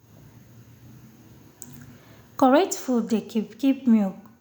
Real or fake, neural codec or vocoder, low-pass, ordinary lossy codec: real; none; none; none